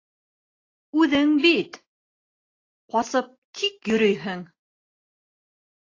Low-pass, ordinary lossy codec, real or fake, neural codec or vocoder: 7.2 kHz; AAC, 32 kbps; real; none